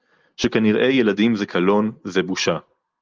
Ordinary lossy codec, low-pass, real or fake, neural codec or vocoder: Opus, 24 kbps; 7.2 kHz; real; none